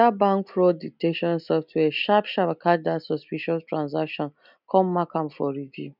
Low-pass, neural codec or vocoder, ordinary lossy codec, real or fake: 5.4 kHz; none; none; real